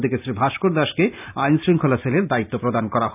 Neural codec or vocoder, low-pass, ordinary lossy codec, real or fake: none; 3.6 kHz; none; real